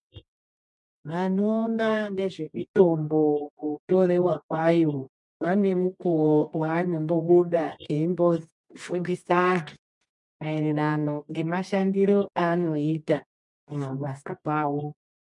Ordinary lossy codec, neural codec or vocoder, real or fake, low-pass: MP3, 64 kbps; codec, 24 kHz, 0.9 kbps, WavTokenizer, medium music audio release; fake; 10.8 kHz